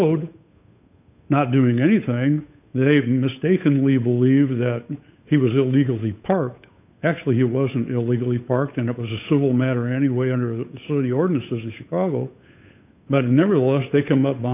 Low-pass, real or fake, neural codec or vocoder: 3.6 kHz; fake; codec, 16 kHz, 8 kbps, FunCodec, trained on Chinese and English, 25 frames a second